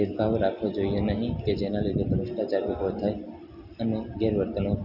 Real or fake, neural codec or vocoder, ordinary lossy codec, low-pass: real; none; none; 5.4 kHz